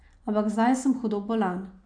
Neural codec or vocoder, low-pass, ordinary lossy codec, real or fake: none; 9.9 kHz; AAC, 64 kbps; real